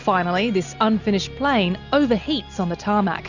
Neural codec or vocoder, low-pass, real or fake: none; 7.2 kHz; real